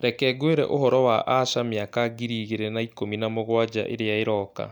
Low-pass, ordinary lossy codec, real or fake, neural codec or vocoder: 19.8 kHz; none; real; none